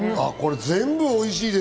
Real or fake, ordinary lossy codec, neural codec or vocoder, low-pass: real; none; none; none